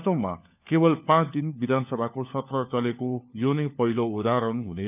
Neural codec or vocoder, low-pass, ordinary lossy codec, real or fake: codec, 16 kHz, 4 kbps, FunCodec, trained on LibriTTS, 50 frames a second; 3.6 kHz; none; fake